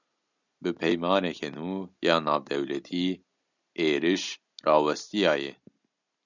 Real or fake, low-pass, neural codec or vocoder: real; 7.2 kHz; none